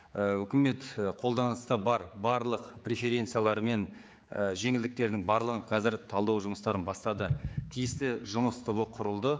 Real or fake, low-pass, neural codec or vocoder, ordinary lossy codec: fake; none; codec, 16 kHz, 4 kbps, X-Codec, HuBERT features, trained on general audio; none